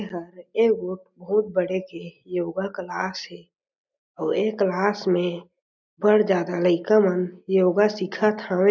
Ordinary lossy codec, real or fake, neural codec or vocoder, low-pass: none; real; none; 7.2 kHz